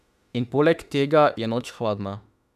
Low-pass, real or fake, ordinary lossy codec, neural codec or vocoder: 14.4 kHz; fake; none; autoencoder, 48 kHz, 32 numbers a frame, DAC-VAE, trained on Japanese speech